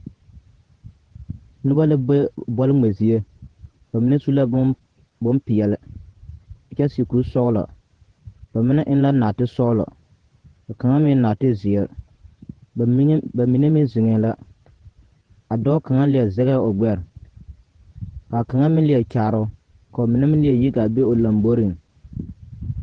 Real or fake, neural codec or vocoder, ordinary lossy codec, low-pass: fake; vocoder, 48 kHz, 128 mel bands, Vocos; Opus, 16 kbps; 9.9 kHz